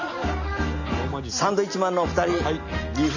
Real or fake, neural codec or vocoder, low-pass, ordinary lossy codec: real; none; 7.2 kHz; none